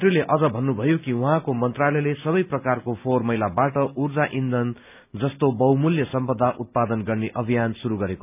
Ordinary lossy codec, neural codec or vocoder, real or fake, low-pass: none; none; real; 3.6 kHz